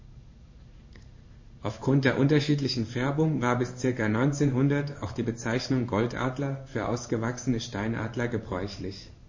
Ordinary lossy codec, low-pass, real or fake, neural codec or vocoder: MP3, 32 kbps; 7.2 kHz; fake; codec, 16 kHz in and 24 kHz out, 1 kbps, XY-Tokenizer